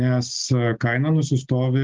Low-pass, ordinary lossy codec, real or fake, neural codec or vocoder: 7.2 kHz; Opus, 32 kbps; real; none